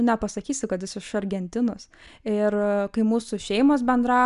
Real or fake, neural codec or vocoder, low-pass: real; none; 10.8 kHz